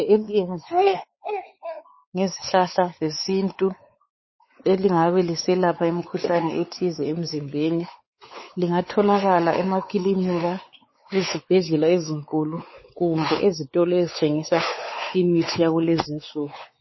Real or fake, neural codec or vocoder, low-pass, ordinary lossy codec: fake; codec, 16 kHz, 4 kbps, X-Codec, WavLM features, trained on Multilingual LibriSpeech; 7.2 kHz; MP3, 24 kbps